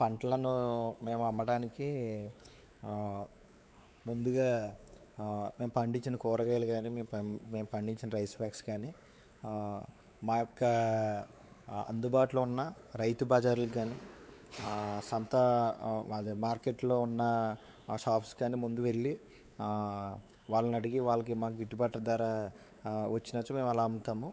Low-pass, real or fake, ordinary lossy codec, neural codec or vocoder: none; fake; none; codec, 16 kHz, 4 kbps, X-Codec, WavLM features, trained on Multilingual LibriSpeech